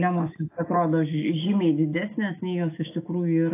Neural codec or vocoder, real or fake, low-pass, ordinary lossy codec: autoencoder, 48 kHz, 128 numbers a frame, DAC-VAE, trained on Japanese speech; fake; 3.6 kHz; AAC, 24 kbps